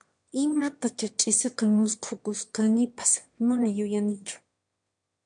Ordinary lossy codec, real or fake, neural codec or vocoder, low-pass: MP3, 64 kbps; fake; autoencoder, 22.05 kHz, a latent of 192 numbers a frame, VITS, trained on one speaker; 9.9 kHz